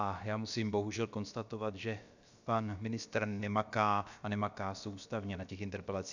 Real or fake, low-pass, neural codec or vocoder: fake; 7.2 kHz; codec, 16 kHz, about 1 kbps, DyCAST, with the encoder's durations